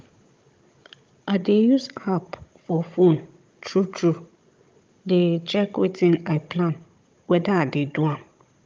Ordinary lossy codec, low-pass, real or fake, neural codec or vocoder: Opus, 24 kbps; 7.2 kHz; fake; codec, 16 kHz, 16 kbps, FunCodec, trained on Chinese and English, 50 frames a second